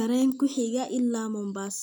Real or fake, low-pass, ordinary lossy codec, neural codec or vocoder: real; none; none; none